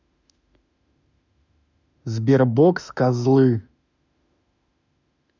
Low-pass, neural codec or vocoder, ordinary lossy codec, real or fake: 7.2 kHz; autoencoder, 48 kHz, 32 numbers a frame, DAC-VAE, trained on Japanese speech; none; fake